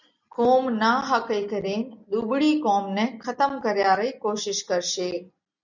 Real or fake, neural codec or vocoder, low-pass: real; none; 7.2 kHz